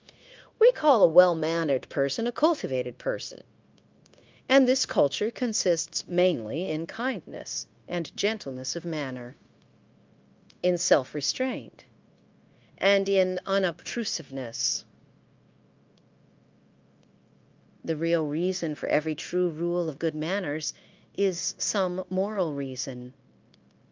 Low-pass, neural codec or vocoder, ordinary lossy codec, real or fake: 7.2 kHz; codec, 16 kHz, 0.9 kbps, LongCat-Audio-Codec; Opus, 24 kbps; fake